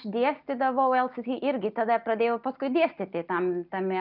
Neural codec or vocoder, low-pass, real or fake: none; 5.4 kHz; real